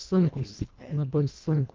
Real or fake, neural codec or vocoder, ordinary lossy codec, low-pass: fake; codec, 24 kHz, 1.5 kbps, HILCodec; Opus, 24 kbps; 7.2 kHz